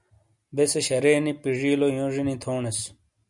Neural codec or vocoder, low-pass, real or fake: none; 10.8 kHz; real